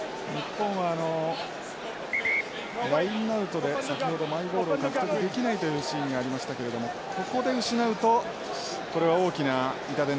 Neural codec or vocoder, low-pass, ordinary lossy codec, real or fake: none; none; none; real